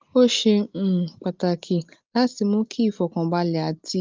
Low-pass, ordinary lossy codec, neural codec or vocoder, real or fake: 7.2 kHz; Opus, 32 kbps; none; real